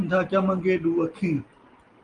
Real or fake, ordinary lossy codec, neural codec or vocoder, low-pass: real; Opus, 24 kbps; none; 9.9 kHz